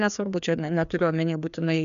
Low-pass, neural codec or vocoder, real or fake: 7.2 kHz; codec, 16 kHz, 2 kbps, FreqCodec, larger model; fake